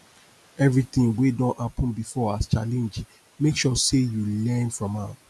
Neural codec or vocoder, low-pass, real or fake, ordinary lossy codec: none; none; real; none